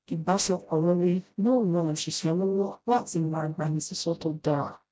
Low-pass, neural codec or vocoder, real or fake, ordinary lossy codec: none; codec, 16 kHz, 0.5 kbps, FreqCodec, smaller model; fake; none